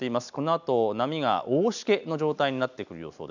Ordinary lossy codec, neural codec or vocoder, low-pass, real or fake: none; none; 7.2 kHz; real